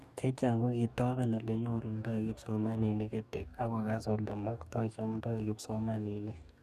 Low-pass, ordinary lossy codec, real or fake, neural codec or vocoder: 14.4 kHz; none; fake; codec, 44.1 kHz, 2.6 kbps, DAC